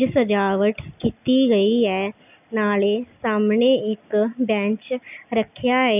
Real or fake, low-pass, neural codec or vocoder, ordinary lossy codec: real; 3.6 kHz; none; none